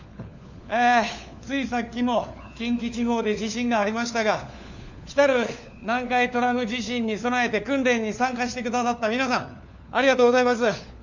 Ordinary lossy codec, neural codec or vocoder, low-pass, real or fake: none; codec, 16 kHz, 4 kbps, FunCodec, trained on LibriTTS, 50 frames a second; 7.2 kHz; fake